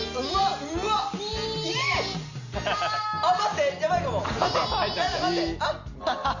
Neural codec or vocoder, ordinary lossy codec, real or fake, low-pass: none; Opus, 64 kbps; real; 7.2 kHz